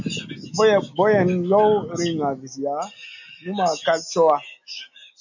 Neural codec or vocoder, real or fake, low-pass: none; real; 7.2 kHz